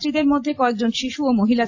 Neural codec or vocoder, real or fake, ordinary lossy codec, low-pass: none; real; AAC, 48 kbps; 7.2 kHz